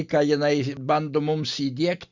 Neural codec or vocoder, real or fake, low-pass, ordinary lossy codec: none; real; 7.2 kHz; Opus, 64 kbps